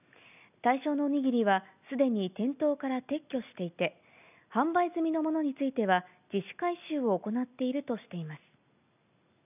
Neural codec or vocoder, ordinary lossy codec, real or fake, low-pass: none; none; real; 3.6 kHz